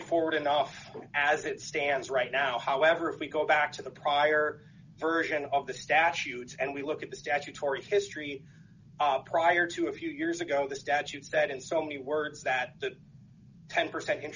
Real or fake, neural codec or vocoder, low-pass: real; none; 7.2 kHz